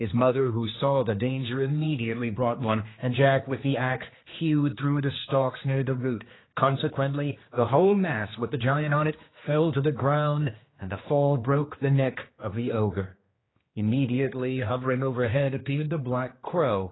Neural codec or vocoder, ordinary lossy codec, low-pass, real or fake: codec, 16 kHz, 2 kbps, X-Codec, HuBERT features, trained on general audio; AAC, 16 kbps; 7.2 kHz; fake